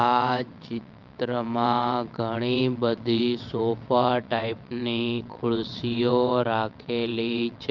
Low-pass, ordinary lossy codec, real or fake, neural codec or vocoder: 7.2 kHz; Opus, 24 kbps; fake; vocoder, 22.05 kHz, 80 mel bands, WaveNeXt